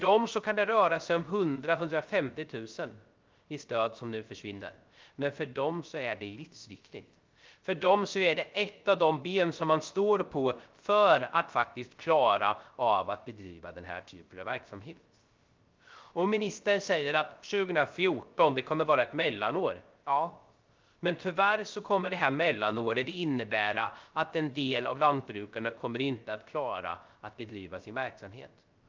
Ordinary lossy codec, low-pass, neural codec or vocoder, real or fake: Opus, 24 kbps; 7.2 kHz; codec, 16 kHz, 0.3 kbps, FocalCodec; fake